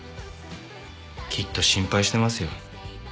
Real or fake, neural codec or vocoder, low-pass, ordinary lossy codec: real; none; none; none